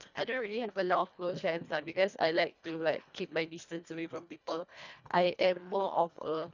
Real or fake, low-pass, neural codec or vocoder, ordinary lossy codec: fake; 7.2 kHz; codec, 24 kHz, 1.5 kbps, HILCodec; none